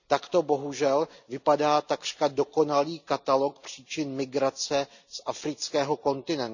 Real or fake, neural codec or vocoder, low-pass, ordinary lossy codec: real; none; 7.2 kHz; none